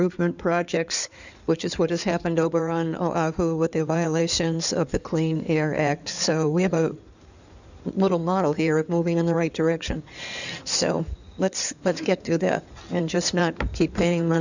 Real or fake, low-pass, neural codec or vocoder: fake; 7.2 kHz; codec, 16 kHz in and 24 kHz out, 2.2 kbps, FireRedTTS-2 codec